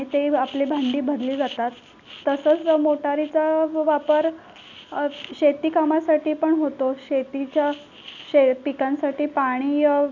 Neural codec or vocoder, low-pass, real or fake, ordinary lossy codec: none; 7.2 kHz; real; none